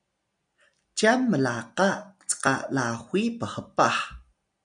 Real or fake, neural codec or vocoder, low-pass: real; none; 9.9 kHz